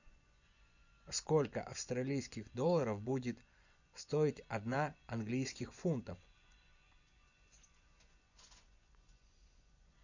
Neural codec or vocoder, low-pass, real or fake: vocoder, 24 kHz, 100 mel bands, Vocos; 7.2 kHz; fake